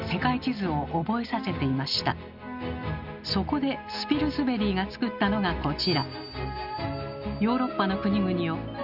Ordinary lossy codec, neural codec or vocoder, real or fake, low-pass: none; none; real; 5.4 kHz